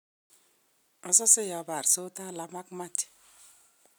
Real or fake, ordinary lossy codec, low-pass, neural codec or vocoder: real; none; none; none